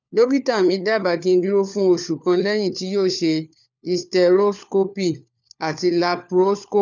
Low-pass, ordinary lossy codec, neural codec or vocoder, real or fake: 7.2 kHz; none; codec, 16 kHz, 4 kbps, FunCodec, trained on LibriTTS, 50 frames a second; fake